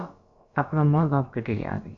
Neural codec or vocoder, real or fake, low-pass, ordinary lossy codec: codec, 16 kHz, about 1 kbps, DyCAST, with the encoder's durations; fake; 7.2 kHz; MP3, 64 kbps